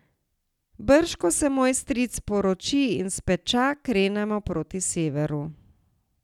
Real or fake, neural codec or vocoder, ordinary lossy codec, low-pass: real; none; none; 19.8 kHz